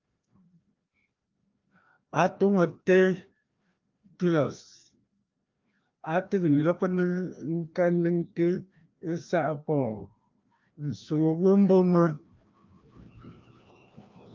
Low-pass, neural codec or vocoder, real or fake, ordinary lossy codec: 7.2 kHz; codec, 16 kHz, 1 kbps, FreqCodec, larger model; fake; Opus, 24 kbps